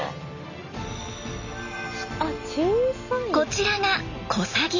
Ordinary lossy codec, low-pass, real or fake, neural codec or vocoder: none; 7.2 kHz; real; none